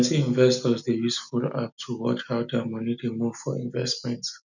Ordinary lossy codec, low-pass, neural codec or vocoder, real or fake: none; 7.2 kHz; none; real